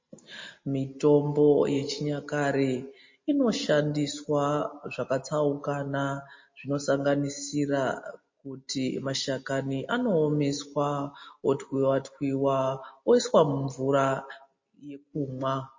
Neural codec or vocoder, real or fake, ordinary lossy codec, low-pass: none; real; MP3, 32 kbps; 7.2 kHz